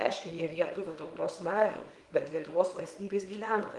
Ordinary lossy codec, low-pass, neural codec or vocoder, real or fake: Opus, 24 kbps; 10.8 kHz; codec, 24 kHz, 0.9 kbps, WavTokenizer, small release; fake